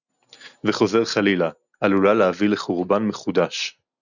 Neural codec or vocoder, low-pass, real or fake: none; 7.2 kHz; real